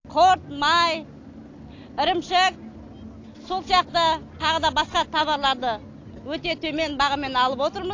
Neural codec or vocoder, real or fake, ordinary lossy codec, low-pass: none; real; AAC, 48 kbps; 7.2 kHz